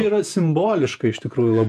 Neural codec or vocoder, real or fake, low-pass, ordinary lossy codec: vocoder, 48 kHz, 128 mel bands, Vocos; fake; 14.4 kHz; AAC, 96 kbps